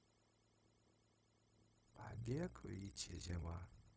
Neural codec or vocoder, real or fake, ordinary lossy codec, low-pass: codec, 16 kHz, 0.4 kbps, LongCat-Audio-Codec; fake; none; none